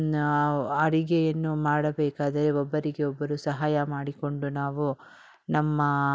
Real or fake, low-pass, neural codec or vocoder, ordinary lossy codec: real; none; none; none